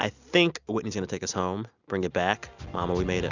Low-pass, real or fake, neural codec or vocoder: 7.2 kHz; real; none